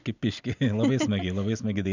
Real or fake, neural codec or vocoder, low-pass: real; none; 7.2 kHz